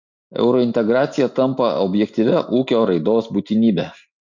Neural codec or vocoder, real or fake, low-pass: none; real; 7.2 kHz